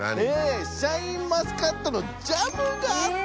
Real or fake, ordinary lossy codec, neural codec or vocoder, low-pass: real; none; none; none